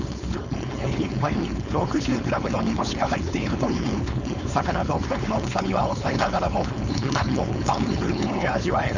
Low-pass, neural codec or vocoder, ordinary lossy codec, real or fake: 7.2 kHz; codec, 16 kHz, 4.8 kbps, FACodec; none; fake